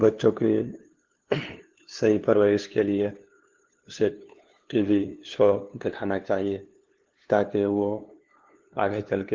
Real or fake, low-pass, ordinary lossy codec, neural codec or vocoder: fake; 7.2 kHz; Opus, 16 kbps; codec, 16 kHz, 2 kbps, FunCodec, trained on LibriTTS, 25 frames a second